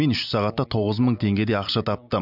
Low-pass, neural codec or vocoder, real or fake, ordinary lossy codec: 5.4 kHz; none; real; none